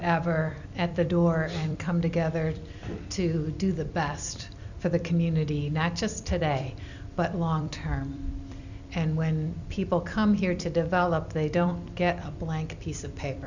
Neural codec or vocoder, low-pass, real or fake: vocoder, 44.1 kHz, 128 mel bands every 256 samples, BigVGAN v2; 7.2 kHz; fake